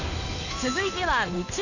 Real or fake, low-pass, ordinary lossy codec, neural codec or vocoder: fake; 7.2 kHz; none; codec, 16 kHz in and 24 kHz out, 1 kbps, XY-Tokenizer